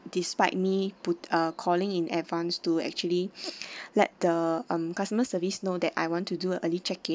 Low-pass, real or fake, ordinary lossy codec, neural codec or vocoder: none; real; none; none